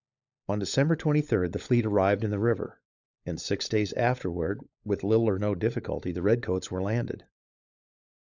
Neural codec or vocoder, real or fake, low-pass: codec, 16 kHz, 16 kbps, FunCodec, trained on LibriTTS, 50 frames a second; fake; 7.2 kHz